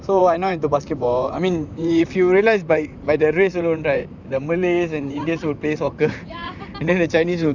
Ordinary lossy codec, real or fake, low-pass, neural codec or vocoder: none; fake; 7.2 kHz; vocoder, 44.1 kHz, 128 mel bands, Pupu-Vocoder